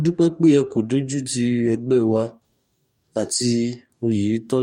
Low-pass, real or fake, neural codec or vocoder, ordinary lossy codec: 19.8 kHz; fake; codec, 44.1 kHz, 2.6 kbps, DAC; MP3, 64 kbps